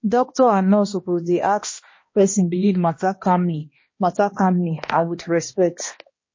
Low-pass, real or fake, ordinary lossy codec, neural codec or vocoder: 7.2 kHz; fake; MP3, 32 kbps; codec, 16 kHz, 1 kbps, X-Codec, HuBERT features, trained on balanced general audio